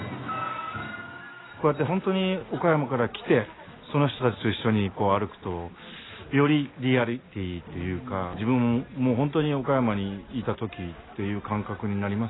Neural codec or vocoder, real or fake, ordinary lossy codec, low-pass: none; real; AAC, 16 kbps; 7.2 kHz